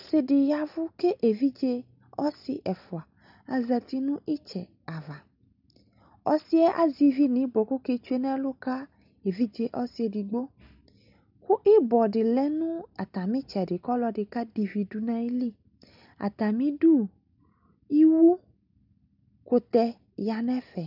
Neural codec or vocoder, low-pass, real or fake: none; 5.4 kHz; real